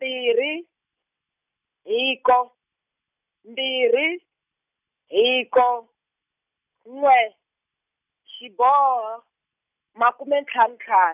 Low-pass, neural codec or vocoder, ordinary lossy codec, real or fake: 3.6 kHz; none; none; real